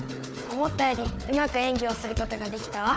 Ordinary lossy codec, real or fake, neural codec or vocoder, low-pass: none; fake; codec, 16 kHz, 4 kbps, FunCodec, trained on Chinese and English, 50 frames a second; none